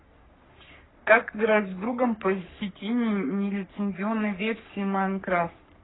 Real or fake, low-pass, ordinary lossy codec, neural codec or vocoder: fake; 7.2 kHz; AAC, 16 kbps; codec, 44.1 kHz, 3.4 kbps, Pupu-Codec